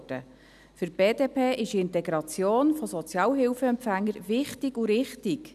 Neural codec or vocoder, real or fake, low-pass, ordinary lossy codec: none; real; 14.4 kHz; none